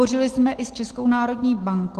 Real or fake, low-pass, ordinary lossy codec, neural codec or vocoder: real; 14.4 kHz; Opus, 16 kbps; none